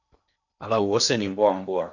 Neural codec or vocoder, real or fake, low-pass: codec, 16 kHz in and 24 kHz out, 0.8 kbps, FocalCodec, streaming, 65536 codes; fake; 7.2 kHz